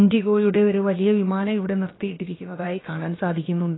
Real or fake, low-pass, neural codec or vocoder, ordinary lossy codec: fake; 7.2 kHz; codec, 16 kHz, about 1 kbps, DyCAST, with the encoder's durations; AAC, 16 kbps